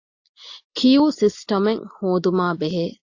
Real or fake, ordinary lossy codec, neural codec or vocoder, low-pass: real; AAC, 48 kbps; none; 7.2 kHz